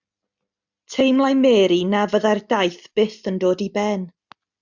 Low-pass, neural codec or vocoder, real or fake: 7.2 kHz; none; real